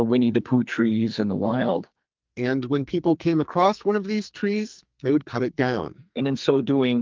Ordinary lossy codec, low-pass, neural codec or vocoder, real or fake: Opus, 24 kbps; 7.2 kHz; codec, 44.1 kHz, 2.6 kbps, SNAC; fake